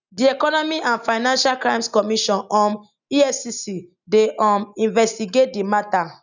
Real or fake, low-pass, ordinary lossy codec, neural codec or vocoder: real; 7.2 kHz; none; none